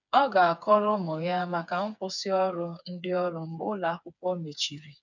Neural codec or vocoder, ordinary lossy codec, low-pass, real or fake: codec, 16 kHz, 4 kbps, FreqCodec, smaller model; none; 7.2 kHz; fake